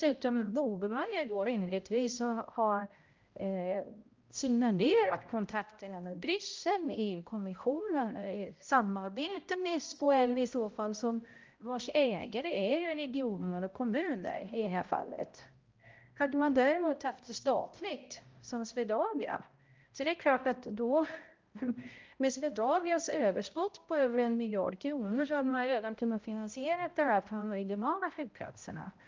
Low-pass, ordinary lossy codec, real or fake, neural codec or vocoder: 7.2 kHz; Opus, 24 kbps; fake; codec, 16 kHz, 0.5 kbps, X-Codec, HuBERT features, trained on balanced general audio